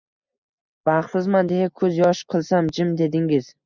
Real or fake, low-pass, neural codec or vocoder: real; 7.2 kHz; none